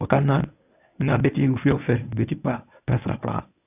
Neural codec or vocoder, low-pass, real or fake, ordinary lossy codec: codec, 24 kHz, 0.9 kbps, WavTokenizer, medium speech release version 1; 3.6 kHz; fake; AAC, 32 kbps